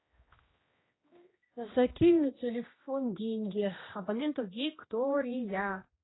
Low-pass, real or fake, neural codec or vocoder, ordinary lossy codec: 7.2 kHz; fake; codec, 16 kHz, 1 kbps, X-Codec, HuBERT features, trained on general audio; AAC, 16 kbps